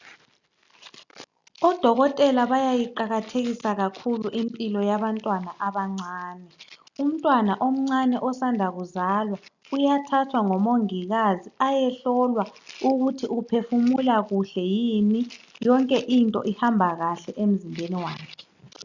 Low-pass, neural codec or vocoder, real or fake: 7.2 kHz; none; real